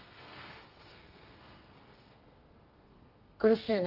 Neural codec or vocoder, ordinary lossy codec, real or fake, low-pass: codec, 32 kHz, 1.9 kbps, SNAC; Opus, 24 kbps; fake; 5.4 kHz